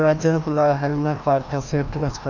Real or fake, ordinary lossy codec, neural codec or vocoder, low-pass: fake; none; codec, 16 kHz, 1 kbps, FreqCodec, larger model; 7.2 kHz